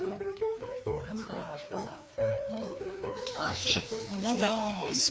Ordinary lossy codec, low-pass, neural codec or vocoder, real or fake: none; none; codec, 16 kHz, 2 kbps, FreqCodec, larger model; fake